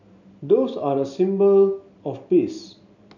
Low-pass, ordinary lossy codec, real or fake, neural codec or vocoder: 7.2 kHz; none; real; none